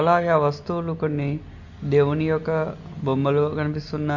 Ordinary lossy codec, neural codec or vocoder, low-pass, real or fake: none; none; 7.2 kHz; real